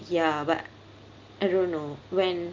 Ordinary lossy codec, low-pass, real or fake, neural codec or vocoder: Opus, 32 kbps; 7.2 kHz; real; none